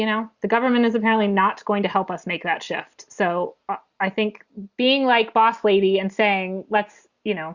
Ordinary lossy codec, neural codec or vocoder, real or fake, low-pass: Opus, 64 kbps; none; real; 7.2 kHz